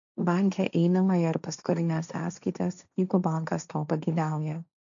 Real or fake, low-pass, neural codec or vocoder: fake; 7.2 kHz; codec, 16 kHz, 1.1 kbps, Voila-Tokenizer